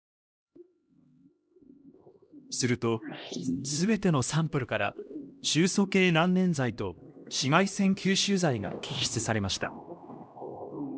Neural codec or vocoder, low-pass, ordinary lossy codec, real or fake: codec, 16 kHz, 1 kbps, X-Codec, HuBERT features, trained on LibriSpeech; none; none; fake